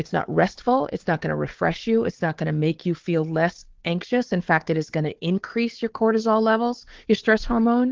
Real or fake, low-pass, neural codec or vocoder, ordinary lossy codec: fake; 7.2 kHz; codec, 16 kHz, 4 kbps, FunCodec, trained on Chinese and English, 50 frames a second; Opus, 16 kbps